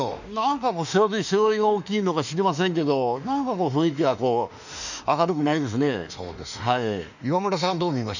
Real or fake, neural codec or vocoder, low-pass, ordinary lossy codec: fake; autoencoder, 48 kHz, 32 numbers a frame, DAC-VAE, trained on Japanese speech; 7.2 kHz; none